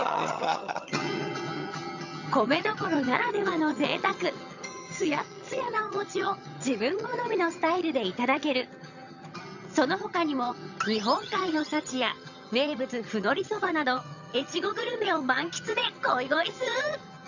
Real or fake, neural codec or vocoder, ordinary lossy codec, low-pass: fake; vocoder, 22.05 kHz, 80 mel bands, HiFi-GAN; none; 7.2 kHz